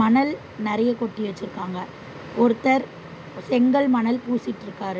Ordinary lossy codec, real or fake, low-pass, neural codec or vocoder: none; real; none; none